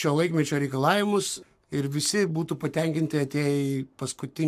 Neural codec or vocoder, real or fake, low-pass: codec, 44.1 kHz, 7.8 kbps, Pupu-Codec; fake; 14.4 kHz